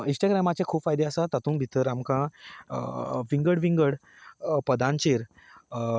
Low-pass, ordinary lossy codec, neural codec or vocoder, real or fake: none; none; none; real